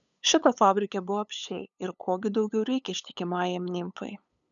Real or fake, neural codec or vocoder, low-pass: fake; codec, 16 kHz, 8 kbps, FunCodec, trained on LibriTTS, 25 frames a second; 7.2 kHz